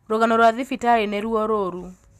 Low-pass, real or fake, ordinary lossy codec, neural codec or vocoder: 14.4 kHz; real; Opus, 64 kbps; none